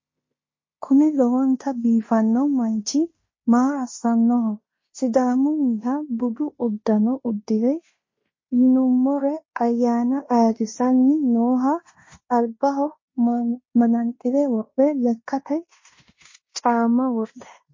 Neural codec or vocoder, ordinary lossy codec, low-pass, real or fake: codec, 16 kHz in and 24 kHz out, 0.9 kbps, LongCat-Audio-Codec, fine tuned four codebook decoder; MP3, 32 kbps; 7.2 kHz; fake